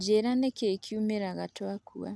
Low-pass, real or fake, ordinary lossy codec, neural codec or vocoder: none; real; none; none